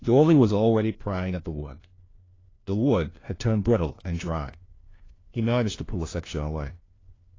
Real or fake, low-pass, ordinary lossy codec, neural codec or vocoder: fake; 7.2 kHz; AAC, 32 kbps; codec, 16 kHz, 1 kbps, FunCodec, trained on LibriTTS, 50 frames a second